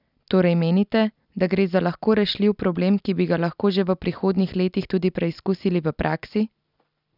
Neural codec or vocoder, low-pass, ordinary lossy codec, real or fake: none; 5.4 kHz; none; real